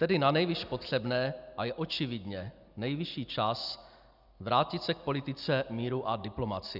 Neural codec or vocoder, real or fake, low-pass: none; real; 5.4 kHz